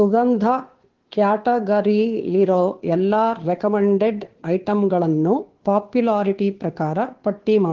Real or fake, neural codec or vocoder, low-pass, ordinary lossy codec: fake; codec, 16 kHz, 2 kbps, FunCodec, trained on Chinese and English, 25 frames a second; 7.2 kHz; Opus, 16 kbps